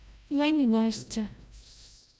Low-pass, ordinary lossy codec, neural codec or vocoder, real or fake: none; none; codec, 16 kHz, 0.5 kbps, FreqCodec, larger model; fake